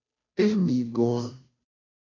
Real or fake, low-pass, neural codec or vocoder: fake; 7.2 kHz; codec, 16 kHz, 0.5 kbps, FunCodec, trained on Chinese and English, 25 frames a second